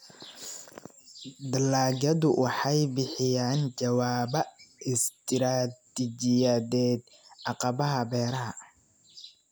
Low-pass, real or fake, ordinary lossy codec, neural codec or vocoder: none; real; none; none